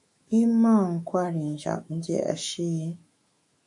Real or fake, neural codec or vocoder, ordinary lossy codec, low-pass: fake; autoencoder, 48 kHz, 128 numbers a frame, DAC-VAE, trained on Japanese speech; MP3, 48 kbps; 10.8 kHz